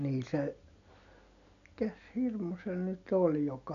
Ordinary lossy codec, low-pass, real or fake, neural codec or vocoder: none; 7.2 kHz; real; none